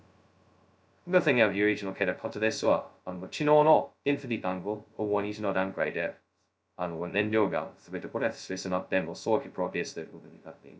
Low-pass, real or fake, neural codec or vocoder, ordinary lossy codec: none; fake; codec, 16 kHz, 0.2 kbps, FocalCodec; none